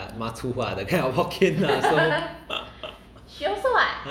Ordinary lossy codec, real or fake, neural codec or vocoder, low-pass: MP3, 96 kbps; fake; vocoder, 48 kHz, 128 mel bands, Vocos; 19.8 kHz